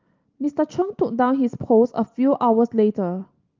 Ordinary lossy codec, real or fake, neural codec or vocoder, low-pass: Opus, 32 kbps; real; none; 7.2 kHz